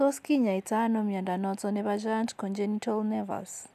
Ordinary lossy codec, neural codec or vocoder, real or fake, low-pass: none; none; real; 14.4 kHz